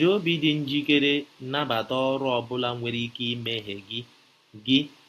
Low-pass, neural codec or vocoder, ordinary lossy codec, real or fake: 14.4 kHz; none; AAC, 48 kbps; real